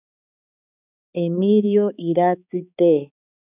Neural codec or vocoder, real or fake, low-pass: codec, 16 kHz, 4 kbps, X-Codec, HuBERT features, trained on balanced general audio; fake; 3.6 kHz